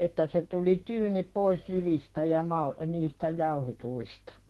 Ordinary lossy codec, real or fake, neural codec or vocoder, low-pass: Opus, 16 kbps; fake; codec, 32 kHz, 1.9 kbps, SNAC; 14.4 kHz